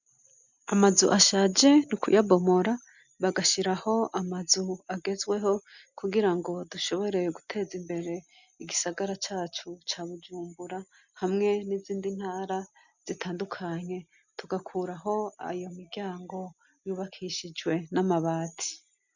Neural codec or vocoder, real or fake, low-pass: none; real; 7.2 kHz